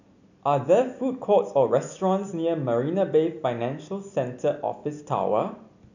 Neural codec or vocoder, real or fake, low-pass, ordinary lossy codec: none; real; 7.2 kHz; none